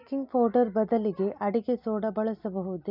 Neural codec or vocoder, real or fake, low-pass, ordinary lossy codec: none; real; 5.4 kHz; none